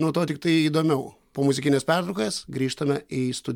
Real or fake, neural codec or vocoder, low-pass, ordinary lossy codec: real; none; 19.8 kHz; MP3, 96 kbps